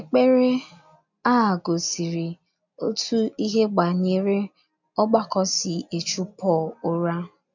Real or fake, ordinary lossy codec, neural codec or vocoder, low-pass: real; none; none; 7.2 kHz